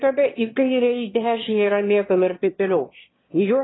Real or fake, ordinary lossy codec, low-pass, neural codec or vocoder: fake; AAC, 16 kbps; 7.2 kHz; autoencoder, 22.05 kHz, a latent of 192 numbers a frame, VITS, trained on one speaker